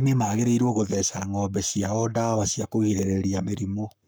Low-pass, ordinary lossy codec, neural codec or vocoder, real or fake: none; none; codec, 44.1 kHz, 7.8 kbps, Pupu-Codec; fake